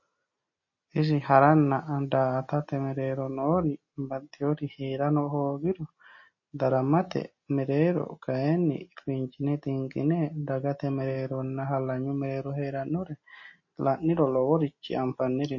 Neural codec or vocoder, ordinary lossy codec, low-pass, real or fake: none; MP3, 32 kbps; 7.2 kHz; real